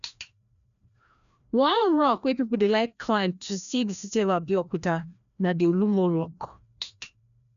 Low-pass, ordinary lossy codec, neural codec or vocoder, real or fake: 7.2 kHz; none; codec, 16 kHz, 1 kbps, FreqCodec, larger model; fake